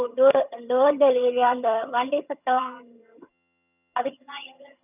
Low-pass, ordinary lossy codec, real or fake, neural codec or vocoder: 3.6 kHz; none; fake; vocoder, 22.05 kHz, 80 mel bands, HiFi-GAN